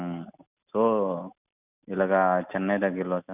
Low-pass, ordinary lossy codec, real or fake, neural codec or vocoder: 3.6 kHz; none; real; none